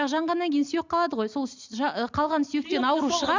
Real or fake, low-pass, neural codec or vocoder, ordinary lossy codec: real; 7.2 kHz; none; MP3, 64 kbps